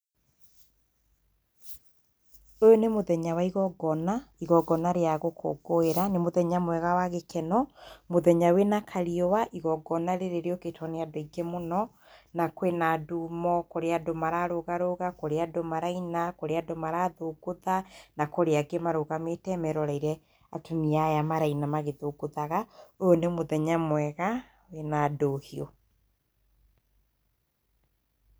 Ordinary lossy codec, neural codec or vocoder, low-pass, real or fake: none; none; none; real